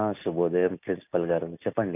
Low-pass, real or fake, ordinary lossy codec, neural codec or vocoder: 3.6 kHz; real; none; none